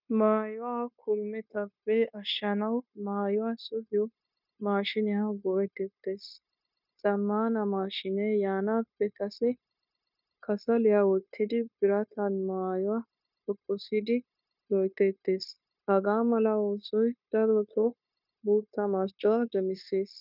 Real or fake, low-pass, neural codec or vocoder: fake; 5.4 kHz; codec, 16 kHz, 0.9 kbps, LongCat-Audio-Codec